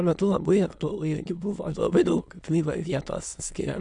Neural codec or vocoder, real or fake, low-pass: autoencoder, 22.05 kHz, a latent of 192 numbers a frame, VITS, trained on many speakers; fake; 9.9 kHz